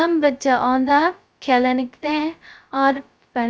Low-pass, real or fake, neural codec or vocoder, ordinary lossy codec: none; fake; codec, 16 kHz, 0.2 kbps, FocalCodec; none